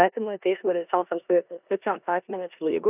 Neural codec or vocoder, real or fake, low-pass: codec, 16 kHz in and 24 kHz out, 0.9 kbps, LongCat-Audio-Codec, four codebook decoder; fake; 3.6 kHz